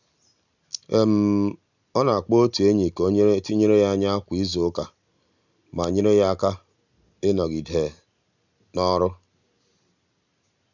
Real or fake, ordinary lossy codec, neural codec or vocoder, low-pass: real; none; none; 7.2 kHz